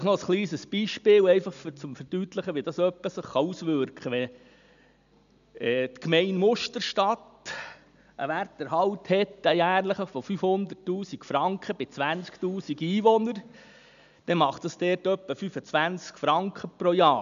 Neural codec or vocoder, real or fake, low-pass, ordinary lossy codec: none; real; 7.2 kHz; none